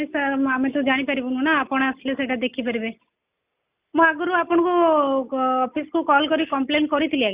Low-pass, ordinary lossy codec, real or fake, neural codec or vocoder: 3.6 kHz; Opus, 64 kbps; real; none